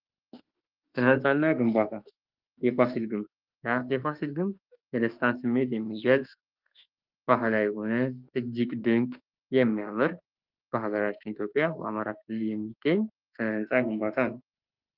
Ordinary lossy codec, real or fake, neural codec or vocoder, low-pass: Opus, 32 kbps; fake; autoencoder, 48 kHz, 32 numbers a frame, DAC-VAE, trained on Japanese speech; 5.4 kHz